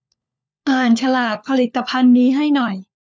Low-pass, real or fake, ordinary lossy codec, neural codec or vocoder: none; fake; none; codec, 16 kHz, 4 kbps, FunCodec, trained on LibriTTS, 50 frames a second